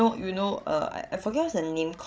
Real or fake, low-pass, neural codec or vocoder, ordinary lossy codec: fake; none; codec, 16 kHz, 16 kbps, FreqCodec, larger model; none